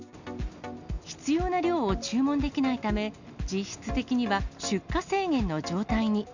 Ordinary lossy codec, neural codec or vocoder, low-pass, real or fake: none; none; 7.2 kHz; real